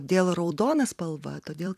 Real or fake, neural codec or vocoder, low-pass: fake; vocoder, 44.1 kHz, 128 mel bands every 256 samples, BigVGAN v2; 14.4 kHz